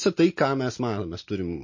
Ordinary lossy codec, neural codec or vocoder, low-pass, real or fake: MP3, 32 kbps; none; 7.2 kHz; real